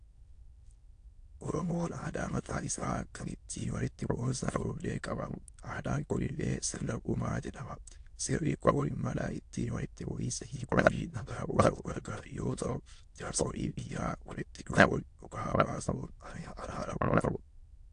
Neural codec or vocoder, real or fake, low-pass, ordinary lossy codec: autoencoder, 22.05 kHz, a latent of 192 numbers a frame, VITS, trained on many speakers; fake; 9.9 kHz; AAC, 48 kbps